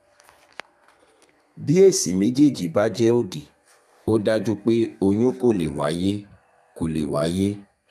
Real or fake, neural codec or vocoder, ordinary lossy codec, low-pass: fake; codec, 32 kHz, 1.9 kbps, SNAC; none; 14.4 kHz